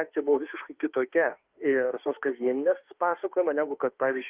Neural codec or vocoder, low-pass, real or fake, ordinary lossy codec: autoencoder, 48 kHz, 32 numbers a frame, DAC-VAE, trained on Japanese speech; 3.6 kHz; fake; Opus, 32 kbps